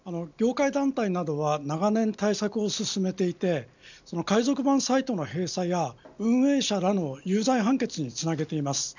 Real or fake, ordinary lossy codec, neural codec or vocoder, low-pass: real; none; none; 7.2 kHz